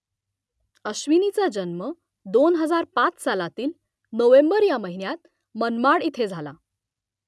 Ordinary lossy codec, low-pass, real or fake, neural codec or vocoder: none; none; real; none